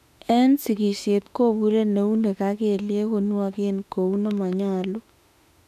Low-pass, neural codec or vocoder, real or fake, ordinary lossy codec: 14.4 kHz; autoencoder, 48 kHz, 32 numbers a frame, DAC-VAE, trained on Japanese speech; fake; none